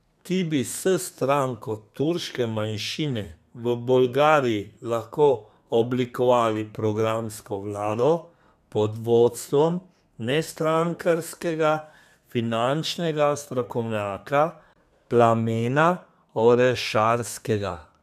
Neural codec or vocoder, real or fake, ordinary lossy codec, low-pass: codec, 32 kHz, 1.9 kbps, SNAC; fake; none; 14.4 kHz